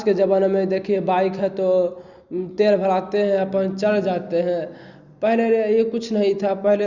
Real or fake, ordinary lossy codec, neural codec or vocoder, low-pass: real; Opus, 64 kbps; none; 7.2 kHz